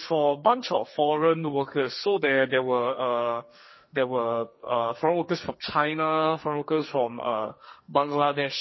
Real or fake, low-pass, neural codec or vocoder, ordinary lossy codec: fake; 7.2 kHz; codec, 44.1 kHz, 2.6 kbps, SNAC; MP3, 24 kbps